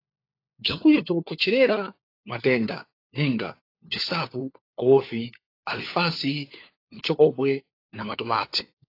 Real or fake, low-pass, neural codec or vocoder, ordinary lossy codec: fake; 5.4 kHz; codec, 16 kHz, 4 kbps, FunCodec, trained on LibriTTS, 50 frames a second; AAC, 32 kbps